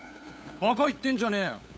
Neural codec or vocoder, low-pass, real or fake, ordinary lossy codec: codec, 16 kHz, 8 kbps, FunCodec, trained on LibriTTS, 25 frames a second; none; fake; none